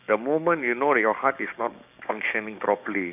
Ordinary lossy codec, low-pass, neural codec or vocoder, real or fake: none; 3.6 kHz; codec, 16 kHz, 6 kbps, DAC; fake